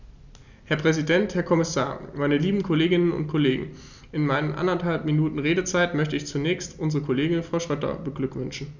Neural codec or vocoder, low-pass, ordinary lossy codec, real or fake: none; 7.2 kHz; none; real